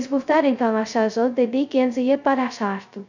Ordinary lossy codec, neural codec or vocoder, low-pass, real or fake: none; codec, 16 kHz, 0.2 kbps, FocalCodec; 7.2 kHz; fake